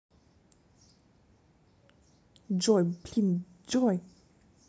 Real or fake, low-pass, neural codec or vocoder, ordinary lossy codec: real; none; none; none